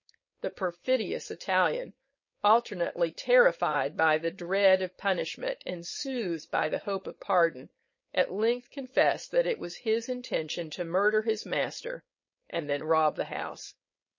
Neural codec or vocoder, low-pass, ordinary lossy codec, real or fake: codec, 16 kHz, 4.8 kbps, FACodec; 7.2 kHz; MP3, 32 kbps; fake